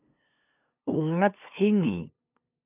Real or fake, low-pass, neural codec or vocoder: fake; 3.6 kHz; codec, 16 kHz, 2 kbps, FunCodec, trained on LibriTTS, 25 frames a second